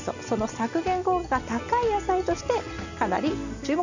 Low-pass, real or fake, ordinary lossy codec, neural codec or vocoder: 7.2 kHz; real; none; none